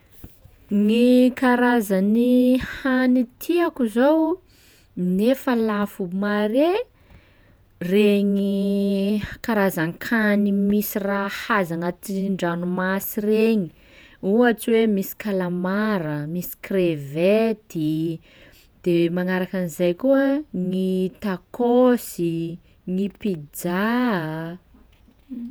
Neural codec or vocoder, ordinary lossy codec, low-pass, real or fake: vocoder, 48 kHz, 128 mel bands, Vocos; none; none; fake